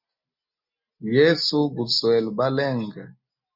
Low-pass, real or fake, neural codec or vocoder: 5.4 kHz; real; none